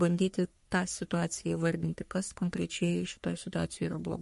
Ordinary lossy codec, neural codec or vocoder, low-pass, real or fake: MP3, 48 kbps; codec, 44.1 kHz, 3.4 kbps, Pupu-Codec; 14.4 kHz; fake